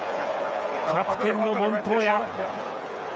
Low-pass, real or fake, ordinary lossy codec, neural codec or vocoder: none; fake; none; codec, 16 kHz, 8 kbps, FreqCodec, smaller model